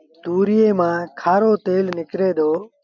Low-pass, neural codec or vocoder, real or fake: 7.2 kHz; none; real